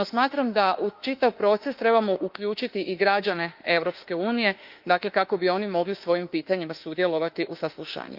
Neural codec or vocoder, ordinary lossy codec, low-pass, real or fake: autoencoder, 48 kHz, 32 numbers a frame, DAC-VAE, trained on Japanese speech; Opus, 32 kbps; 5.4 kHz; fake